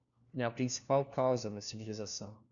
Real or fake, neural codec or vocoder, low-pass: fake; codec, 16 kHz, 1 kbps, FunCodec, trained on LibriTTS, 50 frames a second; 7.2 kHz